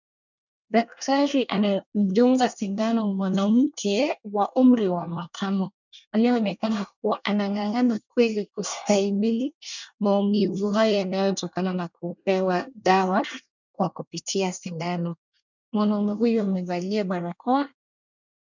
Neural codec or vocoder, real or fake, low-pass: codec, 24 kHz, 1 kbps, SNAC; fake; 7.2 kHz